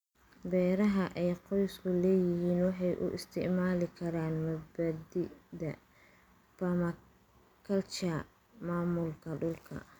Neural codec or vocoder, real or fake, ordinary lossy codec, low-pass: none; real; none; 19.8 kHz